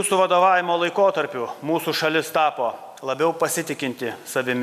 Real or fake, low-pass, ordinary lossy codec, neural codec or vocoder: real; 14.4 kHz; MP3, 96 kbps; none